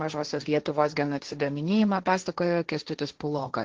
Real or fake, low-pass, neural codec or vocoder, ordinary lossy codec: fake; 7.2 kHz; codec, 16 kHz, 1.1 kbps, Voila-Tokenizer; Opus, 16 kbps